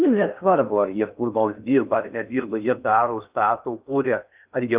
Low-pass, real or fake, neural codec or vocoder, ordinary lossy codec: 3.6 kHz; fake; codec, 16 kHz in and 24 kHz out, 0.6 kbps, FocalCodec, streaming, 4096 codes; Opus, 24 kbps